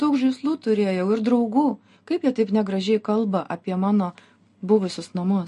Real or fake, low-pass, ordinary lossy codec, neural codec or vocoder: real; 14.4 kHz; MP3, 48 kbps; none